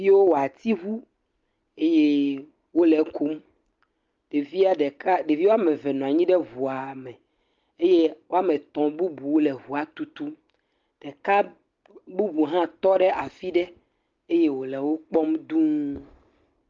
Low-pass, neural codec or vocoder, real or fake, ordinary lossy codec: 7.2 kHz; none; real; Opus, 32 kbps